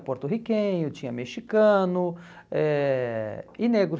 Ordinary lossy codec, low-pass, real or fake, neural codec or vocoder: none; none; real; none